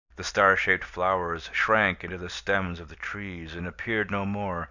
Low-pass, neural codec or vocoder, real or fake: 7.2 kHz; vocoder, 44.1 kHz, 128 mel bands every 256 samples, BigVGAN v2; fake